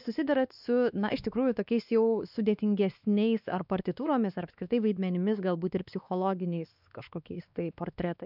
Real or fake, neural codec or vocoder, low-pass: fake; codec, 16 kHz, 2 kbps, X-Codec, WavLM features, trained on Multilingual LibriSpeech; 5.4 kHz